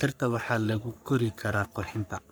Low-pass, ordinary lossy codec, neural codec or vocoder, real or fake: none; none; codec, 44.1 kHz, 3.4 kbps, Pupu-Codec; fake